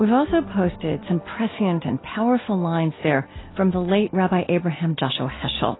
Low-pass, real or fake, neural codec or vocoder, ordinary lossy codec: 7.2 kHz; real; none; AAC, 16 kbps